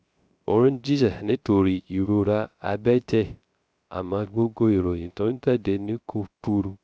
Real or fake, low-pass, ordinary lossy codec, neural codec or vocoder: fake; none; none; codec, 16 kHz, 0.3 kbps, FocalCodec